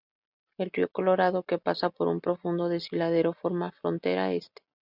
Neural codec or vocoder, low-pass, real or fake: none; 5.4 kHz; real